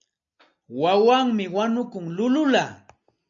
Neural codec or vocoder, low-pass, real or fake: none; 7.2 kHz; real